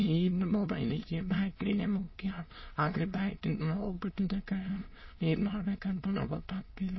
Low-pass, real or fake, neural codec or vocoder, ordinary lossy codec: 7.2 kHz; fake; autoencoder, 22.05 kHz, a latent of 192 numbers a frame, VITS, trained on many speakers; MP3, 24 kbps